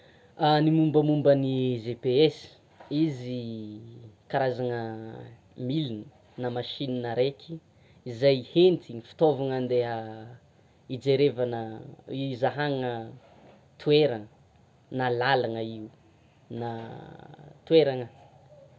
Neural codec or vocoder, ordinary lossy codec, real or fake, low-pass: none; none; real; none